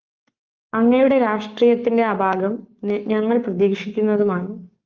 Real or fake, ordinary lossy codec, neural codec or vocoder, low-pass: fake; Opus, 24 kbps; codec, 44.1 kHz, 7.8 kbps, Pupu-Codec; 7.2 kHz